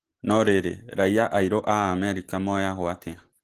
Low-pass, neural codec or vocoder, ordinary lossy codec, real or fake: 14.4 kHz; none; Opus, 16 kbps; real